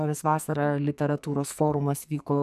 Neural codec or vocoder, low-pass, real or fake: codec, 44.1 kHz, 2.6 kbps, SNAC; 14.4 kHz; fake